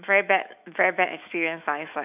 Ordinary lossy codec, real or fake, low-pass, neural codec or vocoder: none; fake; 3.6 kHz; autoencoder, 48 kHz, 128 numbers a frame, DAC-VAE, trained on Japanese speech